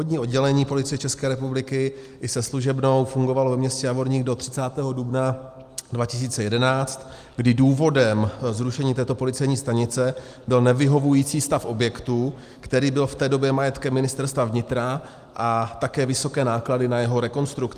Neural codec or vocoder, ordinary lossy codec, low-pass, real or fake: none; Opus, 32 kbps; 14.4 kHz; real